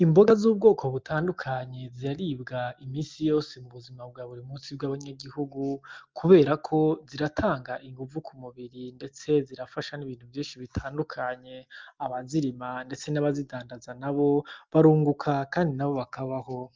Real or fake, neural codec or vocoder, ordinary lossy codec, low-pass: real; none; Opus, 32 kbps; 7.2 kHz